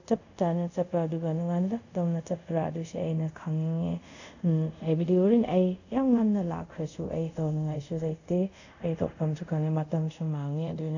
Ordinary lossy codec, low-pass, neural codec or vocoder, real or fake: none; 7.2 kHz; codec, 24 kHz, 0.5 kbps, DualCodec; fake